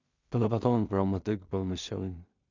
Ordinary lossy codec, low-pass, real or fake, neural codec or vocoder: Opus, 64 kbps; 7.2 kHz; fake; codec, 16 kHz in and 24 kHz out, 0.4 kbps, LongCat-Audio-Codec, two codebook decoder